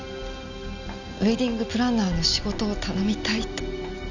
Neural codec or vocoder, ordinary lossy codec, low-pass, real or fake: none; none; 7.2 kHz; real